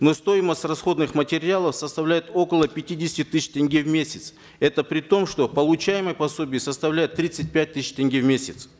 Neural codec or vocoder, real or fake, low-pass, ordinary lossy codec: none; real; none; none